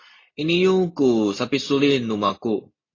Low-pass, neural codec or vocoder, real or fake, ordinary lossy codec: 7.2 kHz; none; real; AAC, 32 kbps